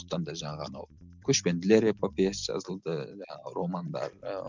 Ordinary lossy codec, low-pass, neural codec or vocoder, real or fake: none; 7.2 kHz; none; real